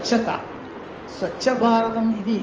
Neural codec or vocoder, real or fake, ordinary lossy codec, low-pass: codec, 16 kHz in and 24 kHz out, 2.2 kbps, FireRedTTS-2 codec; fake; Opus, 24 kbps; 7.2 kHz